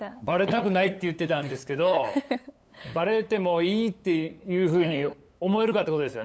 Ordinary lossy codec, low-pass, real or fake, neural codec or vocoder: none; none; fake; codec, 16 kHz, 8 kbps, FunCodec, trained on LibriTTS, 25 frames a second